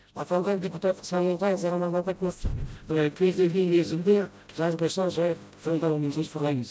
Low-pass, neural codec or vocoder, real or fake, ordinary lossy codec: none; codec, 16 kHz, 0.5 kbps, FreqCodec, smaller model; fake; none